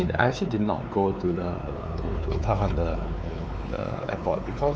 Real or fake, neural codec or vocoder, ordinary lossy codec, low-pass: fake; codec, 16 kHz, 4 kbps, X-Codec, WavLM features, trained on Multilingual LibriSpeech; none; none